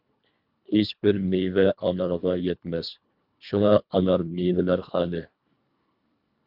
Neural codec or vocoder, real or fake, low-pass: codec, 24 kHz, 1.5 kbps, HILCodec; fake; 5.4 kHz